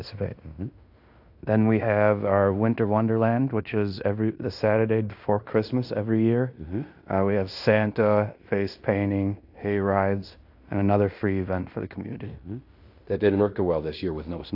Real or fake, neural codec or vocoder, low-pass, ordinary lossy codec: fake; codec, 16 kHz in and 24 kHz out, 0.9 kbps, LongCat-Audio-Codec, fine tuned four codebook decoder; 5.4 kHz; AAC, 48 kbps